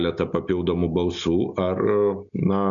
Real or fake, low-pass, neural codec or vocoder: real; 7.2 kHz; none